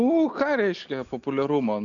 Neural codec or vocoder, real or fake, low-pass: none; real; 7.2 kHz